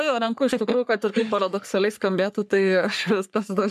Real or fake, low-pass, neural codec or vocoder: fake; 14.4 kHz; codec, 44.1 kHz, 3.4 kbps, Pupu-Codec